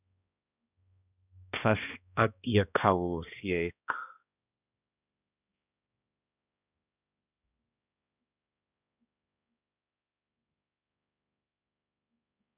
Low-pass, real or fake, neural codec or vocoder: 3.6 kHz; fake; codec, 16 kHz, 1 kbps, X-Codec, HuBERT features, trained on balanced general audio